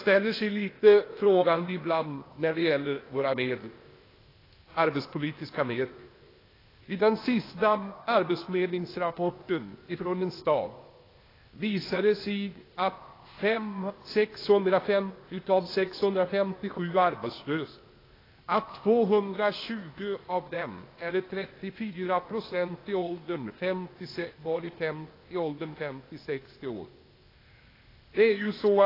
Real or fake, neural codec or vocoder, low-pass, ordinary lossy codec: fake; codec, 16 kHz, 0.8 kbps, ZipCodec; 5.4 kHz; AAC, 24 kbps